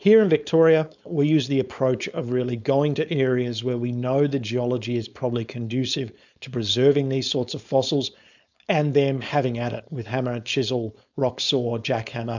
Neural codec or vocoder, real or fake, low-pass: codec, 16 kHz, 4.8 kbps, FACodec; fake; 7.2 kHz